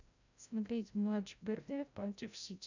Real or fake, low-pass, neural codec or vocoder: fake; 7.2 kHz; codec, 16 kHz, 0.5 kbps, FreqCodec, larger model